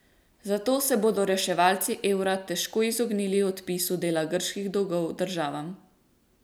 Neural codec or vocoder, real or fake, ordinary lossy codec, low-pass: none; real; none; none